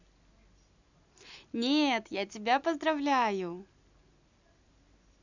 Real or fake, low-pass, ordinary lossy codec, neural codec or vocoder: real; 7.2 kHz; none; none